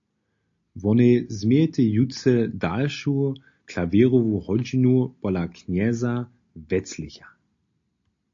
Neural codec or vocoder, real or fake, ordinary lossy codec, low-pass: none; real; AAC, 48 kbps; 7.2 kHz